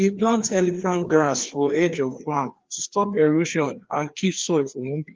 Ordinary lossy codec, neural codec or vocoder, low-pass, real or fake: Opus, 24 kbps; codec, 16 kHz, 2 kbps, FreqCodec, larger model; 7.2 kHz; fake